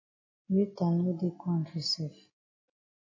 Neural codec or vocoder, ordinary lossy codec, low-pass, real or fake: none; MP3, 32 kbps; 7.2 kHz; real